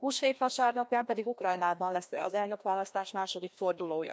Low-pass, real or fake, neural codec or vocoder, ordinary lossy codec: none; fake; codec, 16 kHz, 1 kbps, FreqCodec, larger model; none